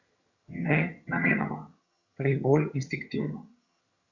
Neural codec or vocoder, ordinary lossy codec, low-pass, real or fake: vocoder, 22.05 kHz, 80 mel bands, HiFi-GAN; Opus, 64 kbps; 7.2 kHz; fake